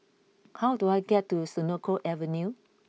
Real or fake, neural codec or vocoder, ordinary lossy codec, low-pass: real; none; none; none